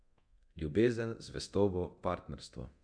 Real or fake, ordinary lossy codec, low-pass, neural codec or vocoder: fake; none; 9.9 kHz; codec, 24 kHz, 0.9 kbps, DualCodec